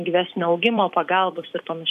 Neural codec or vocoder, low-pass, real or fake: vocoder, 44.1 kHz, 128 mel bands every 256 samples, BigVGAN v2; 14.4 kHz; fake